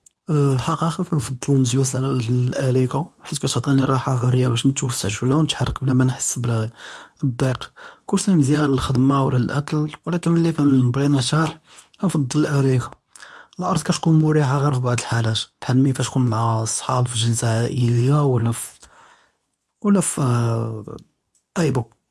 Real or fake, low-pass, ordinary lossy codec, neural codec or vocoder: fake; none; none; codec, 24 kHz, 0.9 kbps, WavTokenizer, medium speech release version 2